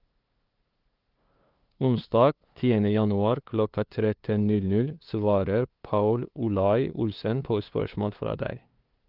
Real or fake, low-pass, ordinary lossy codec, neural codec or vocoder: fake; 5.4 kHz; Opus, 32 kbps; codec, 16 kHz, 2 kbps, FunCodec, trained on LibriTTS, 25 frames a second